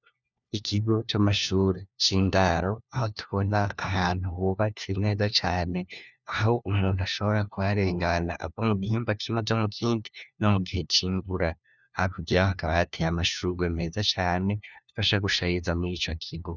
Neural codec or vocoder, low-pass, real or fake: codec, 16 kHz, 1 kbps, FunCodec, trained on LibriTTS, 50 frames a second; 7.2 kHz; fake